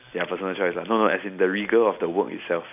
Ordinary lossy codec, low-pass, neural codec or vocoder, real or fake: none; 3.6 kHz; none; real